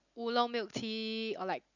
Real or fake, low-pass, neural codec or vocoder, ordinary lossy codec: real; 7.2 kHz; none; none